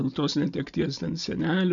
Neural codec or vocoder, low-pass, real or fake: none; 7.2 kHz; real